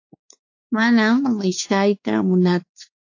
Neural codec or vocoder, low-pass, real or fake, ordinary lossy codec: codec, 16 kHz, 4 kbps, X-Codec, WavLM features, trained on Multilingual LibriSpeech; 7.2 kHz; fake; AAC, 48 kbps